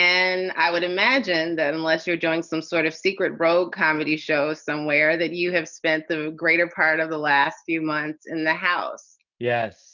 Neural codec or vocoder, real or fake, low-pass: none; real; 7.2 kHz